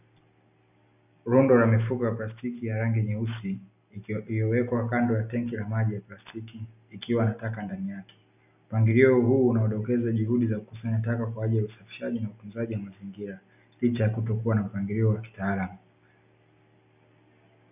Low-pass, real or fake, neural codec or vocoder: 3.6 kHz; real; none